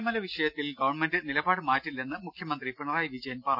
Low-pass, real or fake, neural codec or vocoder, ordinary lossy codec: 5.4 kHz; real; none; none